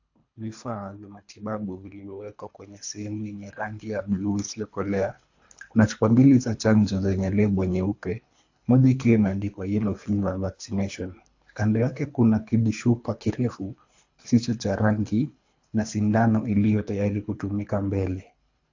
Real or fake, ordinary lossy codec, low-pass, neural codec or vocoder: fake; AAC, 48 kbps; 7.2 kHz; codec, 24 kHz, 3 kbps, HILCodec